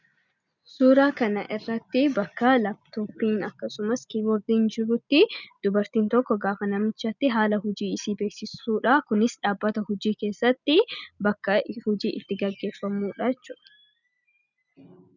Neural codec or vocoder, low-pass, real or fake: none; 7.2 kHz; real